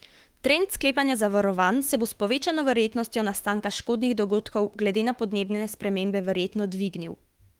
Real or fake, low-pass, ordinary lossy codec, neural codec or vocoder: fake; 19.8 kHz; Opus, 24 kbps; autoencoder, 48 kHz, 32 numbers a frame, DAC-VAE, trained on Japanese speech